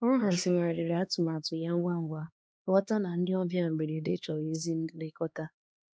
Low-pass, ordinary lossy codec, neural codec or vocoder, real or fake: none; none; codec, 16 kHz, 2 kbps, X-Codec, HuBERT features, trained on LibriSpeech; fake